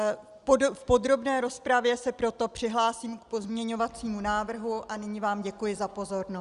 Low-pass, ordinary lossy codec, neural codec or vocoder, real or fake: 10.8 kHz; Opus, 64 kbps; none; real